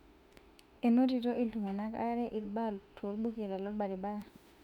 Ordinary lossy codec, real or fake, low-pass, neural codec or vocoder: none; fake; 19.8 kHz; autoencoder, 48 kHz, 32 numbers a frame, DAC-VAE, trained on Japanese speech